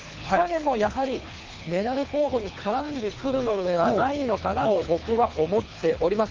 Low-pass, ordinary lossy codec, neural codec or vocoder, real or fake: 7.2 kHz; Opus, 32 kbps; codec, 24 kHz, 3 kbps, HILCodec; fake